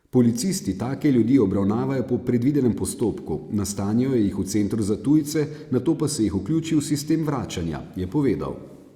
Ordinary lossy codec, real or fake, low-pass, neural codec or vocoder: Opus, 64 kbps; real; 19.8 kHz; none